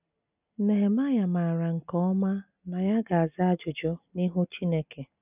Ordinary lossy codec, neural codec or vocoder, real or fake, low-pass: none; none; real; 3.6 kHz